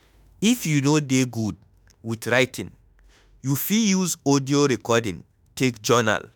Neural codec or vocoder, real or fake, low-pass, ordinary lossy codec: autoencoder, 48 kHz, 32 numbers a frame, DAC-VAE, trained on Japanese speech; fake; none; none